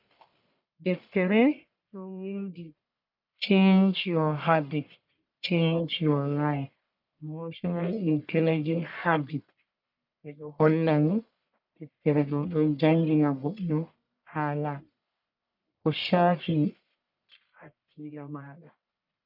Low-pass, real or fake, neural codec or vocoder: 5.4 kHz; fake; codec, 44.1 kHz, 1.7 kbps, Pupu-Codec